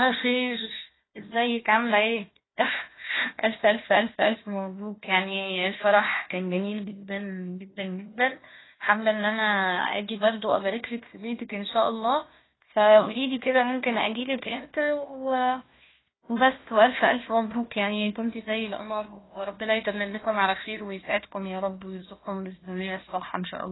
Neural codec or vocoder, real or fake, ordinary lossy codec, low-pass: codec, 16 kHz, 1 kbps, FunCodec, trained on Chinese and English, 50 frames a second; fake; AAC, 16 kbps; 7.2 kHz